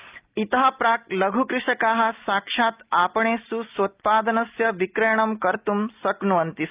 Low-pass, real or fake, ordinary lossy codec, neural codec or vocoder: 3.6 kHz; real; Opus, 24 kbps; none